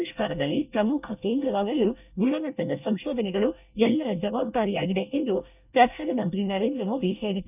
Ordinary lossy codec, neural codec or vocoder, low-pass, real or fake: none; codec, 24 kHz, 1 kbps, SNAC; 3.6 kHz; fake